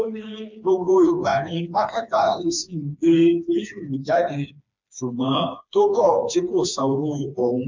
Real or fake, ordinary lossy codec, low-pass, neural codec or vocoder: fake; MP3, 64 kbps; 7.2 kHz; codec, 16 kHz, 2 kbps, FreqCodec, smaller model